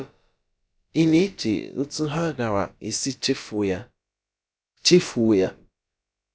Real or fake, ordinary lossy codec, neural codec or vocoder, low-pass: fake; none; codec, 16 kHz, about 1 kbps, DyCAST, with the encoder's durations; none